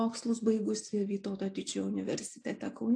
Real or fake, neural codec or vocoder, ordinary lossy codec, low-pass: real; none; AAC, 48 kbps; 9.9 kHz